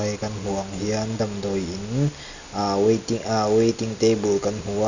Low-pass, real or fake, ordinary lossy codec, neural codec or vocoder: 7.2 kHz; real; none; none